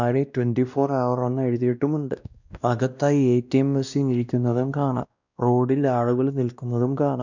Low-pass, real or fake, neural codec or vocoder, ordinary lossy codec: 7.2 kHz; fake; codec, 16 kHz, 1 kbps, X-Codec, WavLM features, trained on Multilingual LibriSpeech; none